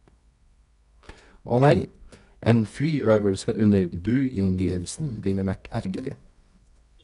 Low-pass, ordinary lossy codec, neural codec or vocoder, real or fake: 10.8 kHz; none; codec, 24 kHz, 0.9 kbps, WavTokenizer, medium music audio release; fake